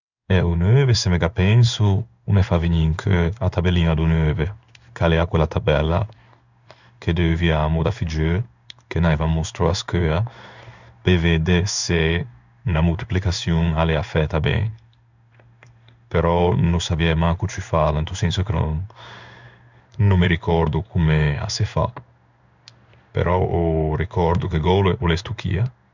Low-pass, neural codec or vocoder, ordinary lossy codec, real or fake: 7.2 kHz; codec, 16 kHz in and 24 kHz out, 1 kbps, XY-Tokenizer; none; fake